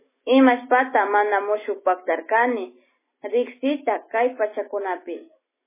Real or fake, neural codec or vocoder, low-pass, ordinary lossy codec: real; none; 3.6 kHz; MP3, 16 kbps